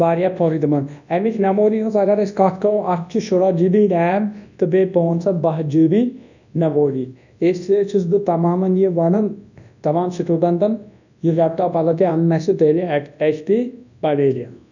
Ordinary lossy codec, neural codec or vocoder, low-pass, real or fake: none; codec, 24 kHz, 0.9 kbps, WavTokenizer, large speech release; 7.2 kHz; fake